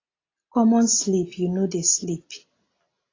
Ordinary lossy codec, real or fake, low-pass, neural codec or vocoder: AAC, 32 kbps; real; 7.2 kHz; none